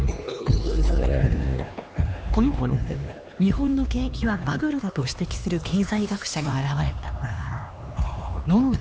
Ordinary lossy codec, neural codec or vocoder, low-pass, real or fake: none; codec, 16 kHz, 2 kbps, X-Codec, HuBERT features, trained on LibriSpeech; none; fake